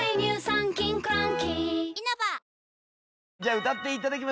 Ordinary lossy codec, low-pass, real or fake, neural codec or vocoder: none; none; real; none